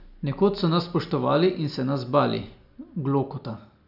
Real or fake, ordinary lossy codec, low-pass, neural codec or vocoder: real; none; 5.4 kHz; none